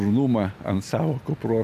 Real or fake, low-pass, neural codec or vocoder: real; 14.4 kHz; none